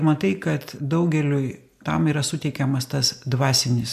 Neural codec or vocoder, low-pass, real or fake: none; 14.4 kHz; real